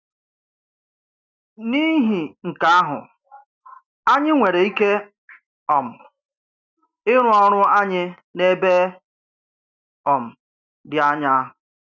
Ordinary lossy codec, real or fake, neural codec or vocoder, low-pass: none; real; none; 7.2 kHz